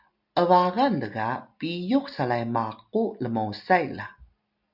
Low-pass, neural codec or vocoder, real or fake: 5.4 kHz; none; real